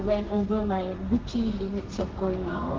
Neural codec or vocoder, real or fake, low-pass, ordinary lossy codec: codec, 32 kHz, 1.9 kbps, SNAC; fake; 7.2 kHz; Opus, 16 kbps